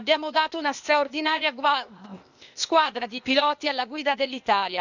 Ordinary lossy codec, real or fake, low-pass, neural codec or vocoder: none; fake; 7.2 kHz; codec, 16 kHz, 0.8 kbps, ZipCodec